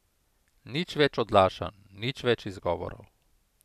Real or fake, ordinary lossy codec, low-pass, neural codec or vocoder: real; none; 14.4 kHz; none